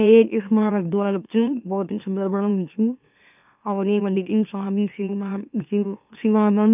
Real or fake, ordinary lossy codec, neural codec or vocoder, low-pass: fake; none; autoencoder, 44.1 kHz, a latent of 192 numbers a frame, MeloTTS; 3.6 kHz